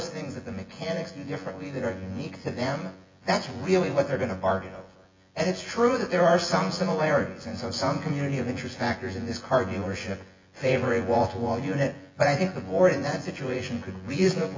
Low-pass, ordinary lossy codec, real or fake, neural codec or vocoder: 7.2 kHz; MP3, 48 kbps; fake; vocoder, 24 kHz, 100 mel bands, Vocos